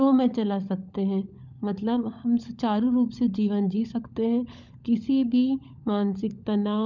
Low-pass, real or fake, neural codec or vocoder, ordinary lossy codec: 7.2 kHz; fake; codec, 16 kHz, 16 kbps, FunCodec, trained on LibriTTS, 50 frames a second; none